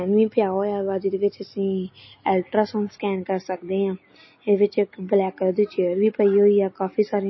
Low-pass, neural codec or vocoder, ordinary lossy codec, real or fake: 7.2 kHz; none; MP3, 24 kbps; real